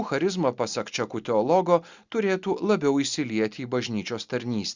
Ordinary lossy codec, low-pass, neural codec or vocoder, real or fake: Opus, 64 kbps; 7.2 kHz; none; real